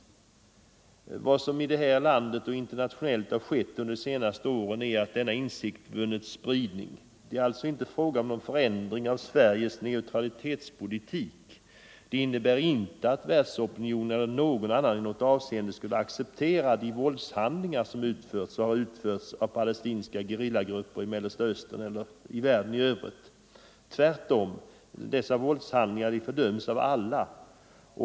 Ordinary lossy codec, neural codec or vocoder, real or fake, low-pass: none; none; real; none